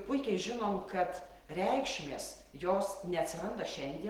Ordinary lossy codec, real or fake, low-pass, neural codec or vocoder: Opus, 16 kbps; real; 19.8 kHz; none